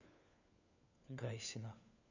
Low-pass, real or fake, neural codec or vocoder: 7.2 kHz; fake; codec, 16 kHz, 4 kbps, FunCodec, trained on LibriTTS, 50 frames a second